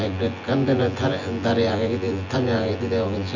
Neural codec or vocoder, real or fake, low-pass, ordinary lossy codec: vocoder, 24 kHz, 100 mel bands, Vocos; fake; 7.2 kHz; none